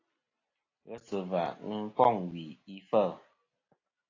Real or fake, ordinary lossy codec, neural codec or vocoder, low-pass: real; AAC, 32 kbps; none; 7.2 kHz